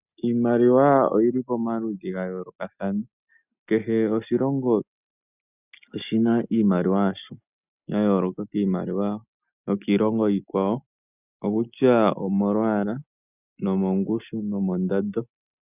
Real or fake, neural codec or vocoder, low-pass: real; none; 3.6 kHz